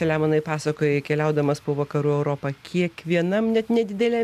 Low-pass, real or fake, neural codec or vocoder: 14.4 kHz; real; none